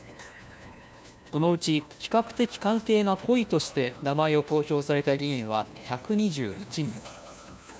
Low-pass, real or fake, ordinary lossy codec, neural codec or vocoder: none; fake; none; codec, 16 kHz, 1 kbps, FunCodec, trained on LibriTTS, 50 frames a second